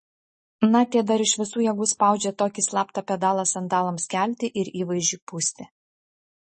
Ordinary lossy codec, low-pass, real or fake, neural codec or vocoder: MP3, 32 kbps; 10.8 kHz; real; none